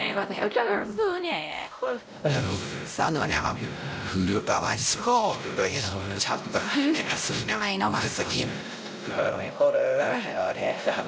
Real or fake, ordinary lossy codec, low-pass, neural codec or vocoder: fake; none; none; codec, 16 kHz, 0.5 kbps, X-Codec, WavLM features, trained on Multilingual LibriSpeech